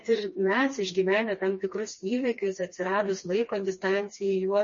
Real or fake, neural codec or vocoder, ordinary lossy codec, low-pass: fake; codec, 16 kHz, 2 kbps, FreqCodec, smaller model; MP3, 32 kbps; 7.2 kHz